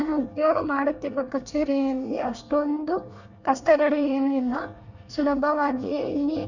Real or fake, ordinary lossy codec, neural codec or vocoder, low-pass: fake; none; codec, 24 kHz, 1 kbps, SNAC; 7.2 kHz